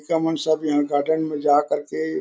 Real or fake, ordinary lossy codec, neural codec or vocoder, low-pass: real; none; none; none